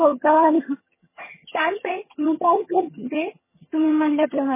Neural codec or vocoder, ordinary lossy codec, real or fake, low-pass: vocoder, 22.05 kHz, 80 mel bands, HiFi-GAN; MP3, 16 kbps; fake; 3.6 kHz